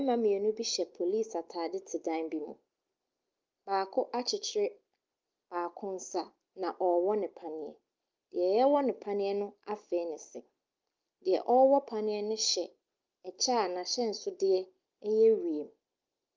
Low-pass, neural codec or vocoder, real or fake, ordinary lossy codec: 7.2 kHz; none; real; Opus, 32 kbps